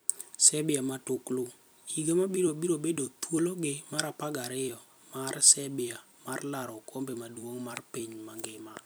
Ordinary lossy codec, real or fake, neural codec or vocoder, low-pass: none; fake; vocoder, 44.1 kHz, 128 mel bands every 256 samples, BigVGAN v2; none